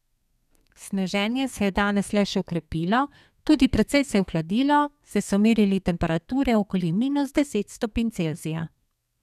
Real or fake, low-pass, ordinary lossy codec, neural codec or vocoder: fake; 14.4 kHz; none; codec, 32 kHz, 1.9 kbps, SNAC